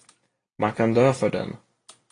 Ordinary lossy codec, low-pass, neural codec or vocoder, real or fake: AAC, 32 kbps; 9.9 kHz; none; real